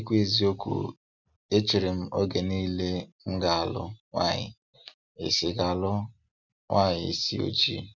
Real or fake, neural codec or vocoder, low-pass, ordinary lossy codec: real; none; 7.2 kHz; none